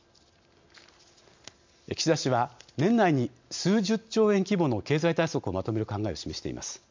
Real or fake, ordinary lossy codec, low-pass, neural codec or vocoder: real; MP3, 64 kbps; 7.2 kHz; none